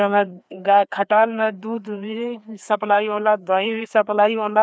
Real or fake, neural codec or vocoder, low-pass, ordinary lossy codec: fake; codec, 16 kHz, 2 kbps, FreqCodec, larger model; none; none